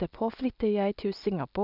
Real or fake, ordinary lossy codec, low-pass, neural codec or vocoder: real; none; 5.4 kHz; none